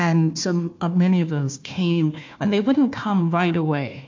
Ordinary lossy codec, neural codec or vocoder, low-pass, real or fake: MP3, 48 kbps; codec, 16 kHz, 1 kbps, FunCodec, trained on Chinese and English, 50 frames a second; 7.2 kHz; fake